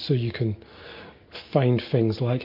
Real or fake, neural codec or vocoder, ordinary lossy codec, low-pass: real; none; MP3, 32 kbps; 5.4 kHz